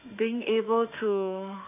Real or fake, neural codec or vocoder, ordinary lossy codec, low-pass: fake; autoencoder, 48 kHz, 32 numbers a frame, DAC-VAE, trained on Japanese speech; none; 3.6 kHz